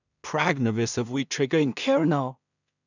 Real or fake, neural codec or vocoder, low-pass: fake; codec, 16 kHz in and 24 kHz out, 0.4 kbps, LongCat-Audio-Codec, two codebook decoder; 7.2 kHz